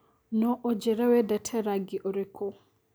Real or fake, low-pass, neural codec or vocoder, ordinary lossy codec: real; none; none; none